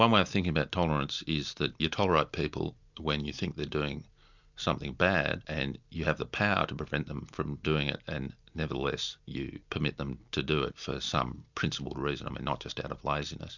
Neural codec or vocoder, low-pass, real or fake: none; 7.2 kHz; real